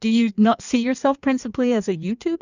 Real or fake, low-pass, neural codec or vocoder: fake; 7.2 kHz; codec, 16 kHz in and 24 kHz out, 1.1 kbps, FireRedTTS-2 codec